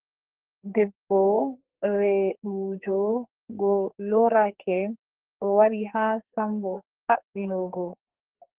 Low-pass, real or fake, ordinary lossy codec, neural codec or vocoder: 3.6 kHz; fake; Opus, 16 kbps; codec, 44.1 kHz, 2.6 kbps, SNAC